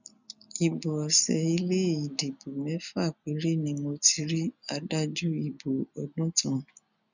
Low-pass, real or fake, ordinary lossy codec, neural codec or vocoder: 7.2 kHz; real; none; none